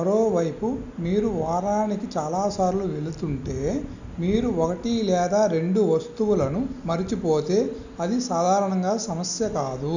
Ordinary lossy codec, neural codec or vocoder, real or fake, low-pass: none; none; real; 7.2 kHz